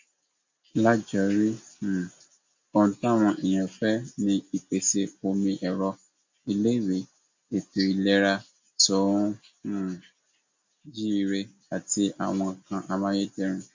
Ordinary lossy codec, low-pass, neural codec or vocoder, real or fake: MP3, 48 kbps; 7.2 kHz; none; real